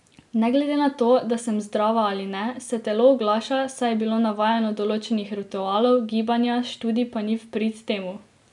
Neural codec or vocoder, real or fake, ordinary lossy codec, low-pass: none; real; none; 10.8 kHz